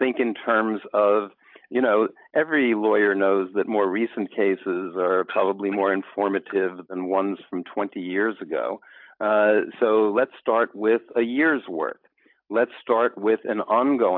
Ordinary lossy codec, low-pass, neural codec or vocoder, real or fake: AAC, 48 kbps; 5.4 kHz; none; real